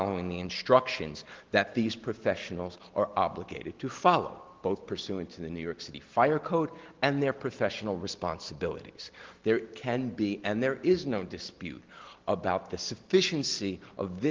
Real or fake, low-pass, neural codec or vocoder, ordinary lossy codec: real; 7.2 kHz; none; Opus, 16 kbps